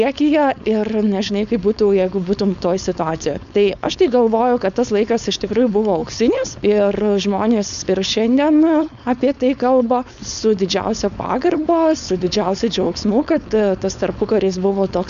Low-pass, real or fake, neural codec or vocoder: 7.2 kHz; fake; codec, 16 kHz, 4.8 kbps, FACodec